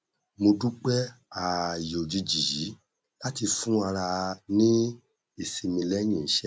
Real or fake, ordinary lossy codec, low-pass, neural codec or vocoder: real; none; none; none